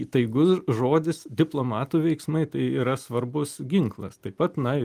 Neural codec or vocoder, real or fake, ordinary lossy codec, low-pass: none; real; Opus, 32 kbps; 14.4 kHz